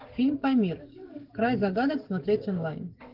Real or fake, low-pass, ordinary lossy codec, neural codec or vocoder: fake; 5.4 kHz; Opus, 32 kbps; codec, 44.1 kHz, 7.8 kbps, DAC